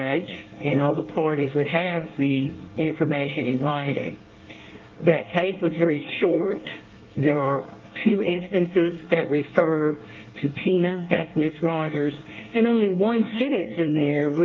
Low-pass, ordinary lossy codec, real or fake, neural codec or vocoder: 7.2 kHz; Opus, 32 kbps; fake; codec, 24 kHz, 1 kbps, SNAC